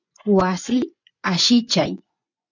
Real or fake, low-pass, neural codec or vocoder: fake; 7.2 kHz; vocoder, 24 kHz, 100 mel bands, Vocos